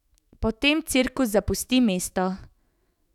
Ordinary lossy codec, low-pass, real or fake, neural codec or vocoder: none; 19.8 kHz; fake; autoencoder, 48 kHz, 128 numbers a frame, DAC-VAE, trained on Japanese speech